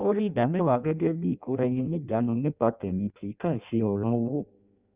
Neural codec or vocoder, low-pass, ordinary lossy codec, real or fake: codec, 16 kHz in and 24 kHz out, 0.6 kbps, FireRedTTS-2 codec; 3.6 kHz; Opus, 64 kbps; fake